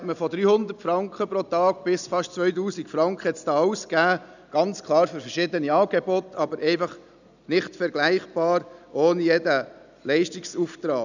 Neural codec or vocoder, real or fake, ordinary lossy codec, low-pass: none; real; none; 7.2 kHz